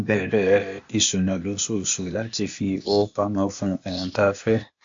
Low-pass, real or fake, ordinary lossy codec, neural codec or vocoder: 7.2 kHz; fake; MP3, 48 kbps; codec, 16 kHz, 0.8 kbps, ZipCodec